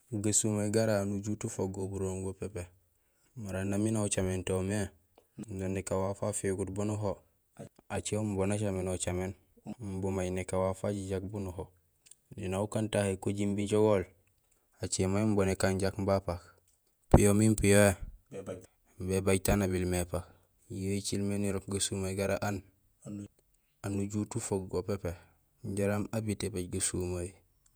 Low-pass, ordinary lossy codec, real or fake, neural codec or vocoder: none; none; fake; vocoder, 48 kHz, 128 mel bands, Vocos